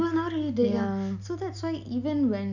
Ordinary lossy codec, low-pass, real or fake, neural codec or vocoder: none; 7.2 kHz; real; none